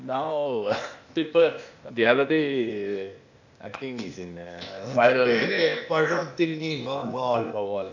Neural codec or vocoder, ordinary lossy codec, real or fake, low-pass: codec, 16 kHz, 0.8 kbps, ZipCodec; none; fake; 7.2 kHz